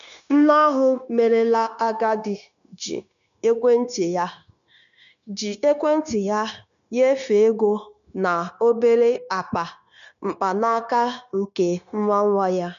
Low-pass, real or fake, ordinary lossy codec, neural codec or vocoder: 7.2 kHz; fake; none; codec, 16 kHz, 0.9 kbps, LongCat-Audio-Codec